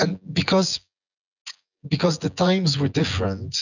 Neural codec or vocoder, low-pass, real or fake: vocoder, 24 kHz, 100 mel bands, Vocos; 7.2 kHz; fake